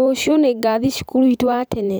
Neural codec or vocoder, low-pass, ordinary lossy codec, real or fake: vocoder, 44.1 kHz, 128 mel bands every 512 samples, BigVGAN v2; none; none; fake